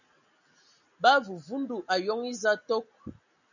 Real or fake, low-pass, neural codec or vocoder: real; 7.2 kHz; none